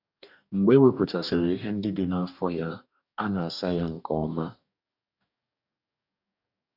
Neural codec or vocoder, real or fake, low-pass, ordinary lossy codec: codec, 44.1 kHz, 2.6 kbps, DAC; fake; 5.4 kHz; none